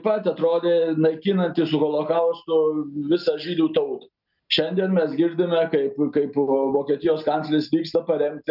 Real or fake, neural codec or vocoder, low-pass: real; none; 5.4 kHz